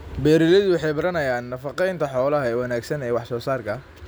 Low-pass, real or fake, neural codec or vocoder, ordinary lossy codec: none; real; none; none